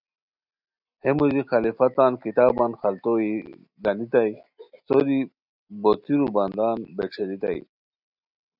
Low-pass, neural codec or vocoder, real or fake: 5.4 kHz; none; real